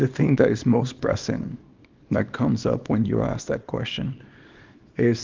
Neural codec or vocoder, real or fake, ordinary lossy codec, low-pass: codec, 24 kHz, 0.9 kbps, WavTokenizer, small release; fake; Opus, 32 kbps; 7.2 kHz